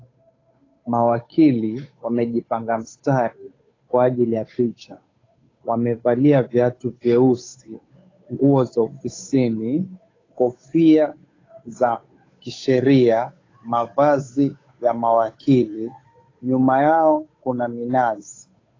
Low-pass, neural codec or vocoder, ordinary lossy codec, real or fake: 7.2 kHz; codec, 16 kHz, 8 kbps, FunCodec, trained on Chinese and English, 25 frames a second; AAC, 32 kbps; fake